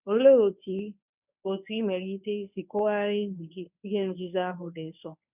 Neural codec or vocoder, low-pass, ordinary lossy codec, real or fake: codec, 24 kHz, 0.9 kbps, WavTokenizer, medium speech release version 1; 3.6 kHz; none; fake